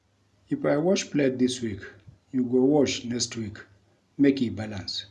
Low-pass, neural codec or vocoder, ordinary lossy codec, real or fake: none; none; none; real